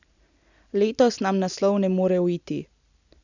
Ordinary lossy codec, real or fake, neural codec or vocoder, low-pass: none; real; none; 7.2 kHz